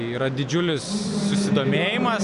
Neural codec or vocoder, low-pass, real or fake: none; 10.8 kHz; real